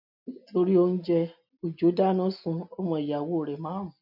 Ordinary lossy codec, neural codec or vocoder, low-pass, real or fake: none; none; 5.4 kHz; real